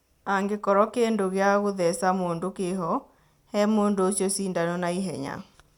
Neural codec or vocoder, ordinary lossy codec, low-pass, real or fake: none; none; 19.8 kHz; real